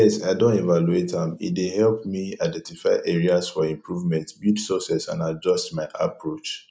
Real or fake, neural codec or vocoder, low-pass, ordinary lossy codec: real; none; none; none